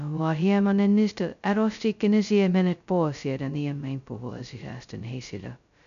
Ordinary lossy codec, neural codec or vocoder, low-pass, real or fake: none; codec, 16 kHz, 0.2 kbps, FocalCodec; 7.2 kHz; fake